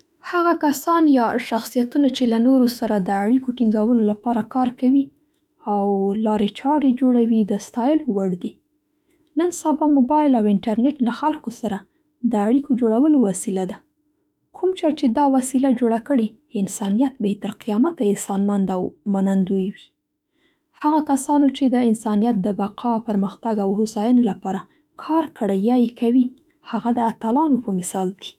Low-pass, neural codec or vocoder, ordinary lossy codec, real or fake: 19.8 kHz; autoencoder, 48 kHz, 32 numbers a frame, DAC-VAE, trained on Japanese speech; none; fake